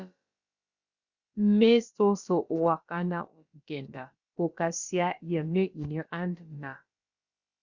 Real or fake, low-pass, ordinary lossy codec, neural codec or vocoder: fake; 7.2 kHz; Opus, 64 kbps; codec, 16 kHz, about 1 kbps, DyCAST, with the encoder's durations